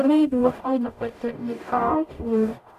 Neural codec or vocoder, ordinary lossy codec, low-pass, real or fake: codec, 44.1 kHz, 0.9 kbps, DAC; none; 14.4 kHz; fake